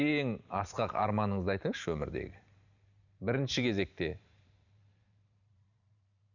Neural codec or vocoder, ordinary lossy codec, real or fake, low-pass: vocoder, 44.1 kHz, 128 mel bands every 512 samples, BigVGAN v2; none; fake; 7.2 kHz